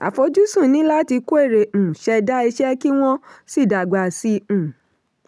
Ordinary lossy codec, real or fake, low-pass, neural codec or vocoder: none; real; none; none